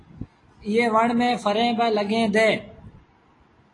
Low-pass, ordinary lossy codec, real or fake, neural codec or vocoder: 10.8 kHz; AAC, 32 kbps; real; none